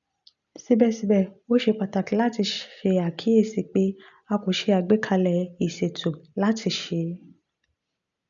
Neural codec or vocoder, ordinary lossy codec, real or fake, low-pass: none; none; real; 7.2 kHz